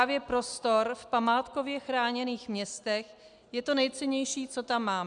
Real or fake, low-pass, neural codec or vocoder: real; 9.9 kHz; none